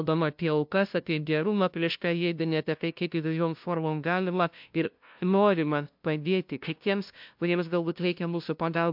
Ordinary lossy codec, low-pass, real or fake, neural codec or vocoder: MP3, 48 kbps; 5.4 kHz; fake; codec, 16 kHz, 0.5 kbps, FunCodec, trained on LibriTTS, 25 frames a second